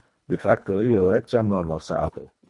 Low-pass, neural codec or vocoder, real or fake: 10.8 kHz; codec, 24 kHz, 1.5 kbps, HILCodec; fake